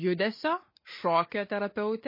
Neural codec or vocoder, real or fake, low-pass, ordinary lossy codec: none; real; 5.4 kHz; MP3, 24 kbps